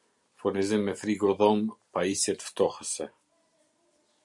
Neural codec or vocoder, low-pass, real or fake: none; 10.8 kHz; real